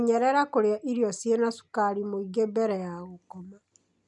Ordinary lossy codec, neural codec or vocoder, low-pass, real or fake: none; none; 10.8 kHz; real